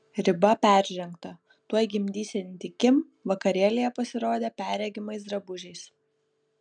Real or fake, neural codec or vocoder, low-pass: real; none; 9.9 kHz